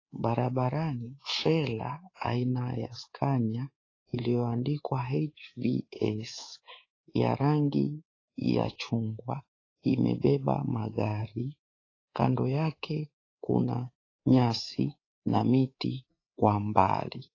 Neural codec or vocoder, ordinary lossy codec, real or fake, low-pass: codec, 24 kHz, 3.1 kbps, DualCodec; AAC, 32 kbps; fake; 7.2 kHz